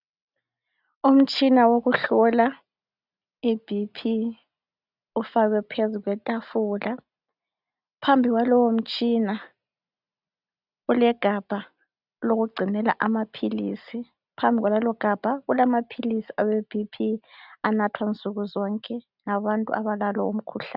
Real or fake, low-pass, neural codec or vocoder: real; 5.4 kHz; none